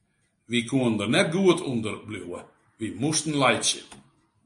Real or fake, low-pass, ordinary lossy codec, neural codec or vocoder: real; 10.8 kHz; MP3, 48 kbps; none